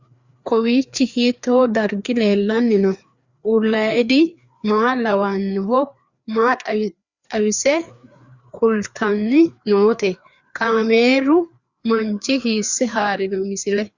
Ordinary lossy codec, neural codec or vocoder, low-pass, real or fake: Opus, 64 kbps; codec, 16 kHz, 2 kbps, FreqCodec, larger model; 7.2 kHz; fake